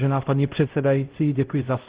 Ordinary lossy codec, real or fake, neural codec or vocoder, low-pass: Opus, 16 kbps; fake; codec, 16 kHz, 0.5 kbps, X-Codec, HuBERT features, trained on LibriSpeech; 3.6 kHz